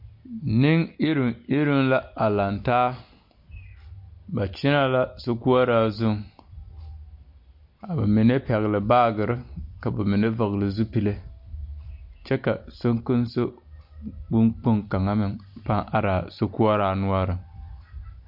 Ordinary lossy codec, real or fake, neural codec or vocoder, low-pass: MP3, 48 kbps; real; none; 5.4 kHz